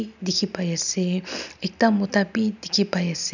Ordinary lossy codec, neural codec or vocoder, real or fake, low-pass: none; none; real; 7.2 kHz